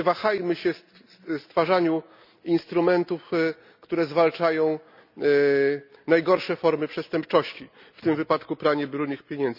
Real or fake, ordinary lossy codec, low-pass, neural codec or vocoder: real; none; 5.4 kHz; none